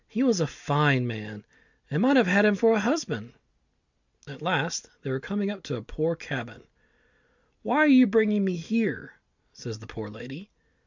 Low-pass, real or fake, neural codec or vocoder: 7.2 kHz; real; none